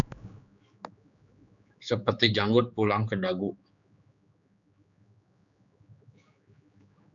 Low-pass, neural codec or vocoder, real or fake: 7.2 kHz; codec, 16 kHz, 4 kbps, X-Codec, HuBERT features, trained on general audio; fake